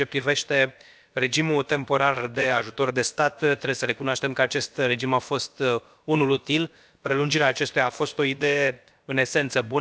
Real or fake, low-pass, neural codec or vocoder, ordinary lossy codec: fake; none; codec, 16 kHz, about 1 kbps, DyCAST, with the encoder's durations; none